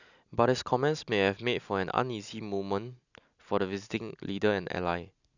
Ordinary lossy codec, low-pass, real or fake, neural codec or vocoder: none; 7.2 kHz; real; none